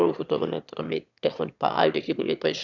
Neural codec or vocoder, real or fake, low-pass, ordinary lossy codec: autoencoder, 22.05 kHz, a latent of 192 numbers a frame, VITS, trained on one speaker; fake; 7.2 kHz; none